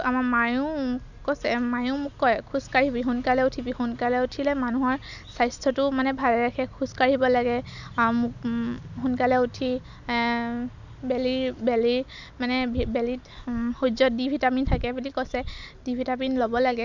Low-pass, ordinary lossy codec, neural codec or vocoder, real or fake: 7.2 kHz; none; none; real